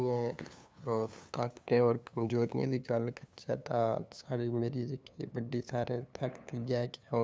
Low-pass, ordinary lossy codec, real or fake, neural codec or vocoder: none; none; fake; codec, 16 kHz, 2 kbps, FunCodec, trained on LibriTTS, 25 frames a second